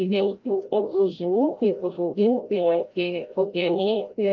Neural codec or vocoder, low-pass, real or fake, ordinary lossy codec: codec, 16 kHz, 0.5 kbps, FreqCodec, larger model; 7.2 kHz; fake; Opus, 24 kbps